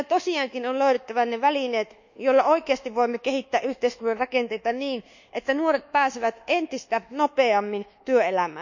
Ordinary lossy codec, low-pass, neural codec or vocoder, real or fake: none; 7.2 kHz; codec, 24 kHz, 1.2 kbps, DualCodec; fake